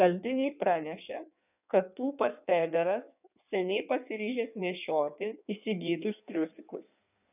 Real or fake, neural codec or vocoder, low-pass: fake; codec, 16 kHz in and 24 kHz out, 1.1 kbps, FireRedTTS-2 codec; 3.6 kHz